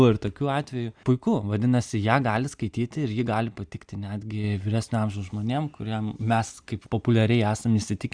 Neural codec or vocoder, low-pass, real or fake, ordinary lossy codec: none; 9.9 kHz; real; Opus, 64 kbps